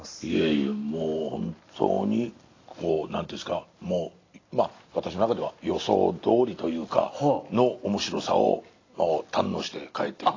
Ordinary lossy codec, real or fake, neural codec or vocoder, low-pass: AAC, 32 kbps; real; none; 7.2 kHz